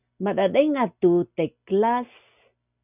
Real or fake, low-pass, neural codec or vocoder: real; 3.6 kHz; none